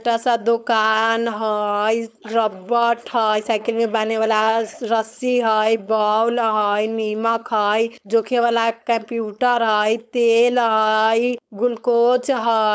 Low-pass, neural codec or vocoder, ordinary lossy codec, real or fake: none; codec, 16 kHz, 4.8 kbps, FACodec; none; fake